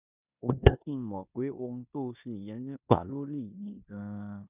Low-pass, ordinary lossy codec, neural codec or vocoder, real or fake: 3.6 kHz; none; codec, 16 kHz in and 24 kHz out, 0.9 kbps, LongCat-Audio-Codec, four codebook decoder; fake